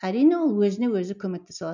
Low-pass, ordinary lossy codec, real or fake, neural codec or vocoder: 7.2 kHz; none; real; none